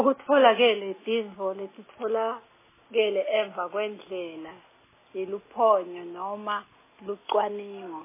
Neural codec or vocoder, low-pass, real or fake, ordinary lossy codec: none; 3.6 kHz; real; MP3, 16 kbps